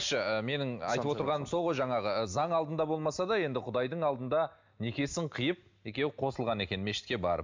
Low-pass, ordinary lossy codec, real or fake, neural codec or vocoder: 7.2 kHz; MP3, 64 kbps; real; none